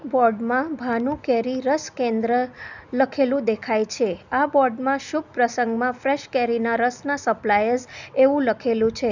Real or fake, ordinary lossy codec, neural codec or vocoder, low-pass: real; none; none; 7.2 kHz